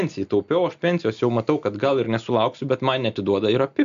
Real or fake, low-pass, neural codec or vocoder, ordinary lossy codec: real; 7.2 kHz; none; MP3, 64 kbps